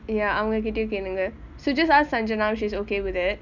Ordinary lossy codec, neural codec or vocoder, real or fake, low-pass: none; none; real; 7.2 kHz